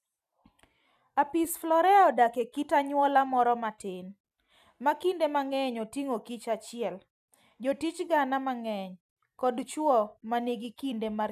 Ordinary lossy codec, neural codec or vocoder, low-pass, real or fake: none; none; 14.4 kHz; real